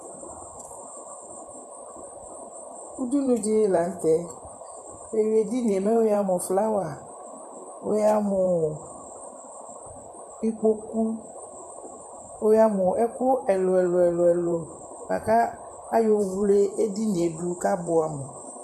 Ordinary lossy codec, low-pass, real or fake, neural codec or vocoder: MP3, 64 kbps; 14.4 kHz; fake; vocoder, 44.1 kHz, 128 mel bands, Pupu-Vocoder